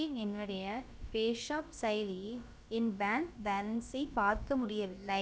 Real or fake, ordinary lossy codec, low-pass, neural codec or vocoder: fake; none; none; codec, 16 kHz, about 1 kbps, DyCAST, with the encoder's durations